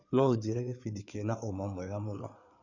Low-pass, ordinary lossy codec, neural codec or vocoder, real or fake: 7.2 kHz; none; codec, 16 kHz in and 24 kHz out, 2.2 kbps, FireRedTTS-2 codec; fake